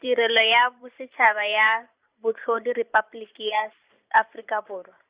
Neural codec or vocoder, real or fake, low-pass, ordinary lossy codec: vocoder, 44.1 kHz, 128 mel bands every 512 samples, BigVGAN v2; fake; 3.6 kHz; Opus, 64 kbps